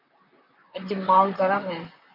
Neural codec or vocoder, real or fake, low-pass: vocoder, 44.1 kHz, 128 mel bands, Pupu-Vocoder; fake; 5.4 kHz